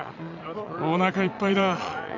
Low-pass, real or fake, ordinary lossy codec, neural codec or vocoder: 7.2 kHz; fake; none; vocoder, 22.05 kHz, 80 mel bands, Vocos